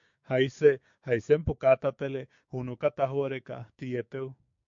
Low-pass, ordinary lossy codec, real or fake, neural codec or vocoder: 7.2 kHz; MP3, 48 kbps; fake; codec, 16 kHz, 6 kbps, DAC